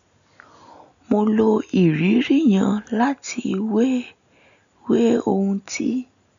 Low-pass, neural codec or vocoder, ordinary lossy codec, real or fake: 7.2 kHz; none; none; real